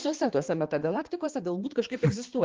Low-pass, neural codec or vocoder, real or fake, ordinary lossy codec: 7.2 kHz; codec, 16 kHz, 2 kbps, X-Codec, HuBERT features, trained on balanced general audio; fake; Opus, 16 kbps